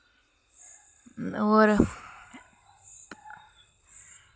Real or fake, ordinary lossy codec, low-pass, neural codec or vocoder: real; none; none; none